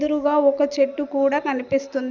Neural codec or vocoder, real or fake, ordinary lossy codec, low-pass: none; real; none; 7.2 kHz